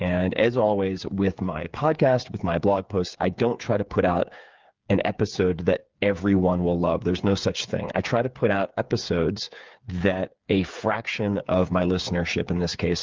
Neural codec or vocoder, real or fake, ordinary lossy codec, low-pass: codec, 16 kHz, 8 kbps, FreqCodec, smaller model; fake; Opus, 24 kbps; 7.2 kHz